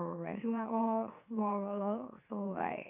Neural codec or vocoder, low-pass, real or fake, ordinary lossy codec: autoencoder, 44.1 kHz, a latent of 192 numbers a frame, MeloTTS; 3.6 kHz; fake; none